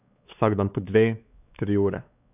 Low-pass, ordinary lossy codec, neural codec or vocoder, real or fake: 3.6 kHz; none; codec, 16 kHz, 4 kbps, X-Codec, WavLM features, trained on Multilingual LibriSpeech; fake